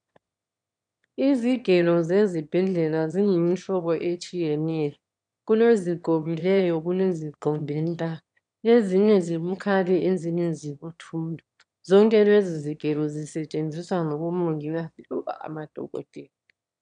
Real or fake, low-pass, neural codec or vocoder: fake; 9.9 kHz; autoencoder, 22.05 kHz, a latent of 192 numbers a frame, VITS, trained on one speaker